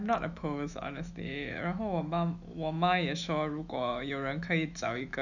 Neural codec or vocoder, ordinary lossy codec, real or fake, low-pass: autoencoder, 48 kHz, 128 numbers a frame, DAC-VAE, trained on Japanese speech; none; fake; 7.2 kHz